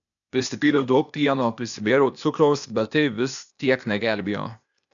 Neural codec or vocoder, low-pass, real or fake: codec, 16 kHz, 0.8 kbps, ZipCodec; 7.2 kHz; fake